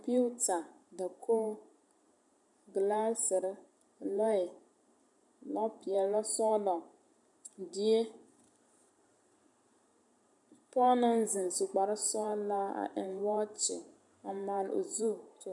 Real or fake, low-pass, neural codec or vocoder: fake; 10.8 kHz; vocoder, 44.1 kHz, 128 mel bands every 512 samples, BigVGAN v2